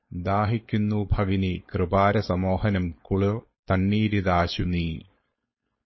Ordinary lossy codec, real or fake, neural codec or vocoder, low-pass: MP3, 24 kbps; fake; codec, 16 kHz, 4.8 kbps, FACodec; 7.2 kHz